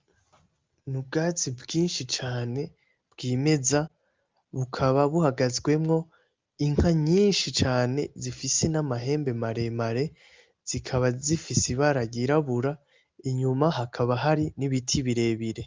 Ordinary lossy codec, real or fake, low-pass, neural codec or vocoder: Opus, 24 kbps; real; 7.2 kHz; none